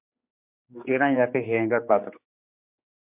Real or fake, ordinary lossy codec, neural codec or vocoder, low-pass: fake; MP3, 32 kbps; codec, 16 kHz, 4 kbps, X-Codec, HuBERT features, trained on general audio; 3.6 kHz